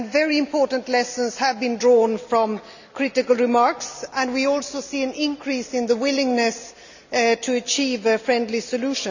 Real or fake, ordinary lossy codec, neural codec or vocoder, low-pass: real; none; none; 7.2 kHz